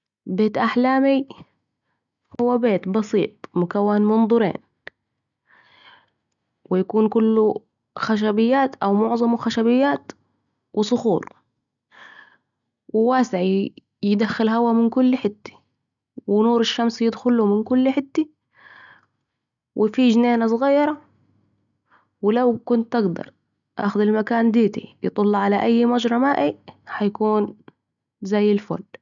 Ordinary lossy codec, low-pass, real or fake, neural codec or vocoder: none; 7.2 kHz; real; none